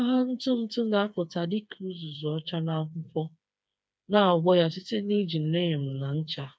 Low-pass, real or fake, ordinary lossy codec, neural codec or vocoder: none; fake; none; codec, 16 kHz, 4 kbps, FreqCodec, smaller model